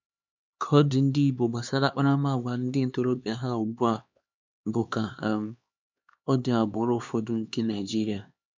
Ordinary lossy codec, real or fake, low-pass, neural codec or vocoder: MP3, 64 kbps; fake; 7.2 kHz; codec, 16 kHz, 2 kbps, X-Codec, HuBERT features, trained on LibriSpeech